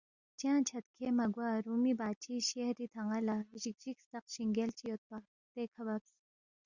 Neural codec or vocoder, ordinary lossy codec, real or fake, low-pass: none; Opus, 64 kbps; real; 7.2 kHz